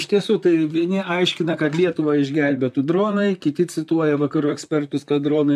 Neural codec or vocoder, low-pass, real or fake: vocoder, 44.1 kHz, 128 mel bands, Pupu-Vocoder; 14.4 kHz; fake